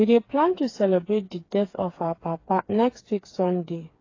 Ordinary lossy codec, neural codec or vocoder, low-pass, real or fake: AAC, 32 kbps; codec, 16 kHz, 4 kbps, FreqCodec, smaller model; 7.2 kHz; fake